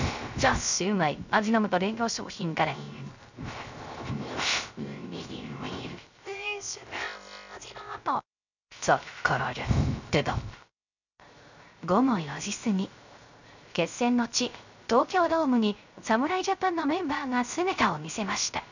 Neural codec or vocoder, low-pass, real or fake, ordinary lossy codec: codec, 16 kHz, 0.3 kbps, FocalCodec; 7.2 kHz; fake; none